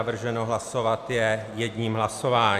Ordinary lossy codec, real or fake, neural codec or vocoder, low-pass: MP3, 96 kbps; real; none; 14.4 kHz